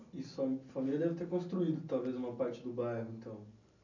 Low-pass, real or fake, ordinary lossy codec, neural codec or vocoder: 7.2 kHz; real; none; none